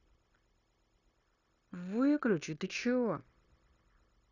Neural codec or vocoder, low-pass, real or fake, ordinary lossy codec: codec, 16 kHz, 0.9 kbps, LongCat-Audio-Codec; 7.2 kHz; fake; Opus, 64 kbps